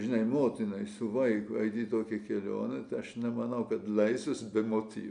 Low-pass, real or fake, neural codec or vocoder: 9.9 kHz; real; none